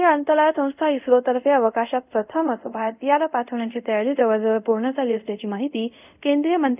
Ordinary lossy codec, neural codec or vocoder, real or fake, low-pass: none; codec, 24 kHz, 0.5 kbps, DualCodec; fake; 3.6 kHz